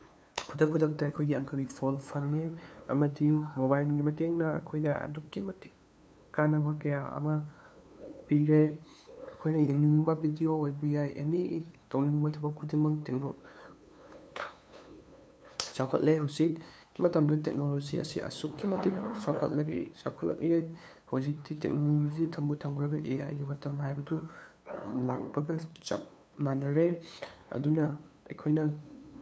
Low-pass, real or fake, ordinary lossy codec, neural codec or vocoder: none; fake; none; codec, 16 kHz, 2 kbps, FunCodec, trained on LibriTTS, 25 frames a second